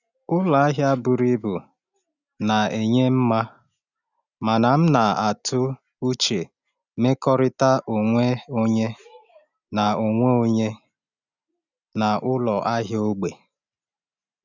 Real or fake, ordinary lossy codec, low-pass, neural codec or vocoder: real; none; 7.2 kHz; none